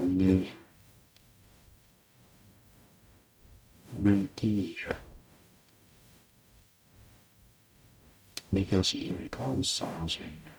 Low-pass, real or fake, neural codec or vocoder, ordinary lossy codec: none; fake; codec, 44.1 kHz, 0.9 kbps, DAC; none